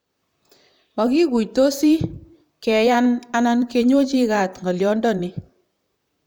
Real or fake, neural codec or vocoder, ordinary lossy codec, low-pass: fake; vocoder, 44.1 kHz, 128 mel bands, Pupu-Vocoder; none; none